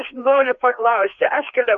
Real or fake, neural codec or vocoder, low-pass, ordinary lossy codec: fake; codec, 16 kHz, 2 kbps, FreqCodec, larger model; 7.2 kHz; AAC, 64 kbps